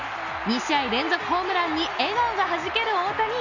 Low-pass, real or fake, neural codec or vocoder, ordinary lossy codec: 7.2 kHz; real; none; none